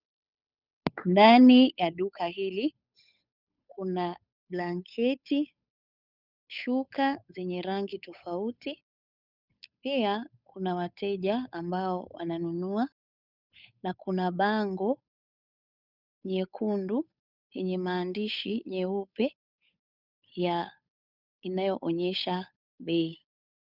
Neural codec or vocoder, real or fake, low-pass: codec, 16 kHz, 8 kbps, FunCodec, trained on Chinese and English, 25 frames a second; fake; 5.4 kHz